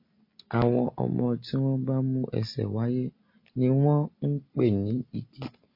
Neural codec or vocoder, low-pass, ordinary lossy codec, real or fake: none; 5.4 kHz; MP3, 32 kbps; real